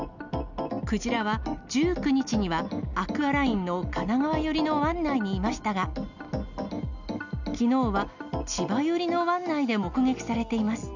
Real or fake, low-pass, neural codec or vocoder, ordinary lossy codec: real; 7.2 kHz; none; none